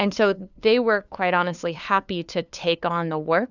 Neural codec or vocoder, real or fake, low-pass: codec, 16 kHz, 2 kbps, FunCodec, trained on LibriTTS, 25 frames a second; fake; 7.2 kHz